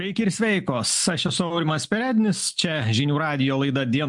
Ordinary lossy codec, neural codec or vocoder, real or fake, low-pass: MP3, 64 kbps; none; real; 10.8 kHz